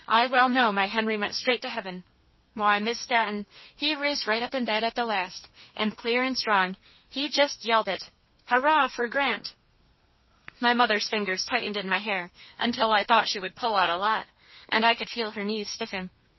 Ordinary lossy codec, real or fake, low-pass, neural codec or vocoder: MP3, 24 kbps; fake; 7.2 kHz; codec, 32 kHz, 1.9 kbps, SNAC